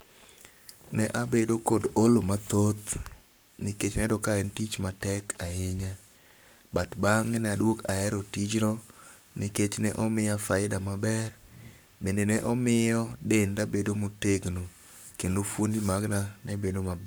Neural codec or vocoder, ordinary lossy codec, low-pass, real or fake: codec, 44.1 kHz, 7.8 kbps, Pupu-Codec; none; none; fake